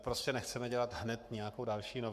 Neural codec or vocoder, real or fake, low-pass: codec, 44.1 kHz, 7.8 kbps, Pupu-Codec; fake; 14.4 kHz